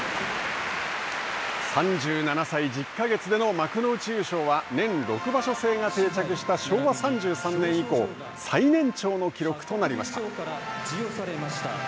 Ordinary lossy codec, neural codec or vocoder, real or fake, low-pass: none; none; real; none